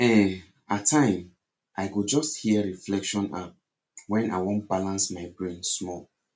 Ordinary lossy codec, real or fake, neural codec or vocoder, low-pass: none; real; none; none